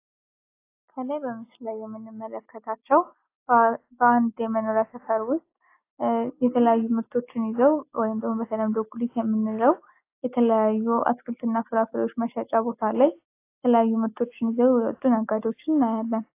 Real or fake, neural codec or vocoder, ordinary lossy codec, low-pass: real; none; AAC, 24 kbps; 3.6 kHz